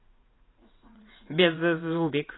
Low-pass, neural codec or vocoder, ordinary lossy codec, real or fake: 7.2 kHz; vocoder, 44.1 kHz, 80 mel bands, Vocos; AAC, 16 kbps; fake